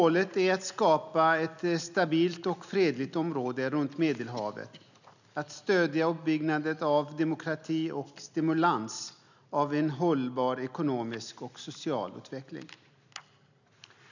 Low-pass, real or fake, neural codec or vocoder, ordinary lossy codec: 7.2 kHz; real; none; none